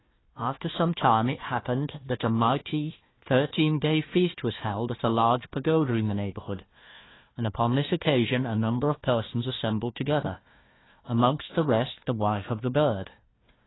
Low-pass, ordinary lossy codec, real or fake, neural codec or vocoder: 7.2 kHz; AAC, 16 kbps; fake; codec, 16 kHz, 1 kbps, FunCodec, trained on Chinese and English, 50 frames a second